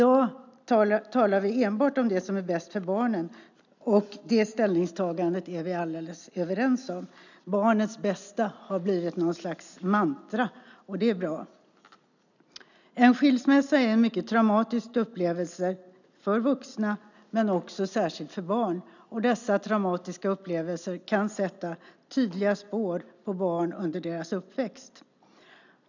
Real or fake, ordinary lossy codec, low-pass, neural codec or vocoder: real; none; 7.2 kHz; none